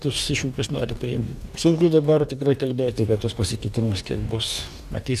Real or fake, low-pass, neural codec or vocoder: fake; 14.4 kHz; codec, 44.1 kHz, 2.6 kbps, DAC